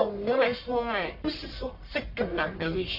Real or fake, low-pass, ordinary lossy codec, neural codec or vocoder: fake; 5.4 kHz; MP3, 32 kbps; codec, 44.1 kHz, 1.7 kbps, Pupu-Codec